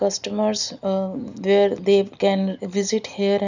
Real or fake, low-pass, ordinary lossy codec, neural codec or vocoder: real; 7.2 kHz; none; none